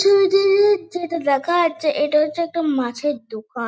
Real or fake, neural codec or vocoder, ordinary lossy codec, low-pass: real; none; none; none